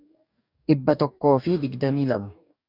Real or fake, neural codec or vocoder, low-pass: fake; codec, 44.1 kHz, 2.6 kbps, DAC; 5.4 kHz